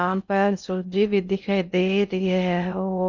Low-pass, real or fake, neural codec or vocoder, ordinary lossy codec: 7.2 kHz; fake; codec, 16 kHz in and 24 kHz out, 0.6 kbps, FocalCodec, streaming, 2048 codes; none